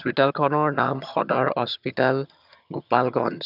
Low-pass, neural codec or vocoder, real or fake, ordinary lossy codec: 5.4 kHz; vocoder, 22.05 kHz, 80 mel bands, HiFi-GAN; fake; none